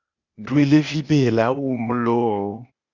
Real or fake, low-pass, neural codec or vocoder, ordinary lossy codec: fake; 7.2 kHz; codec, 16 kHz, 0.8 kbps, ZipCodec; Opus, 64 kbps